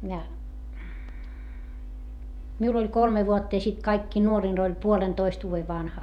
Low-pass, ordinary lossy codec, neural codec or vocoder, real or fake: 19.8 kHz; none; vocoder, 48 kHz, 128 mel bands, Vocos; fake